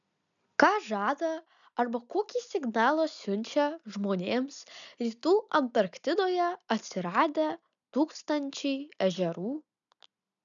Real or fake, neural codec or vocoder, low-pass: real; none; 7.2 kHz